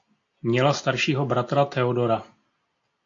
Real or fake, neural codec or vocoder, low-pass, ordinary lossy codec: real; none; 7.2 kHz; AAC, 48 kbps